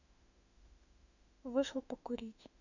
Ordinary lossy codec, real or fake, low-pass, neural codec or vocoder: none; fake; 7.2 kHz; autoencoder, 48 kHz, 32 numbers a frame, DAC-VAE, trained on Japanese speech